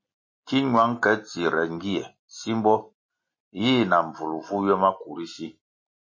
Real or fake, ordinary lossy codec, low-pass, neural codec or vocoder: real; MP3, 32 kbps; 7.2 kHz; none